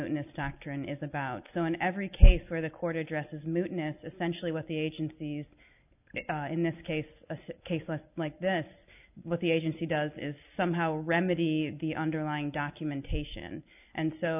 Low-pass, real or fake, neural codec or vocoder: 3.6 kHz; real; none